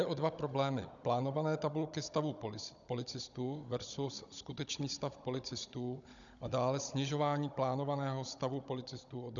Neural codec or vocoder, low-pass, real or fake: codec, 16 kHz, 16 kbps, FunCodec, trained on Chinese and English, 50 frames a second; 7.2 kHz; fake